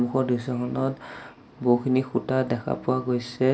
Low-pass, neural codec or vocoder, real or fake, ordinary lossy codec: none; none; real; none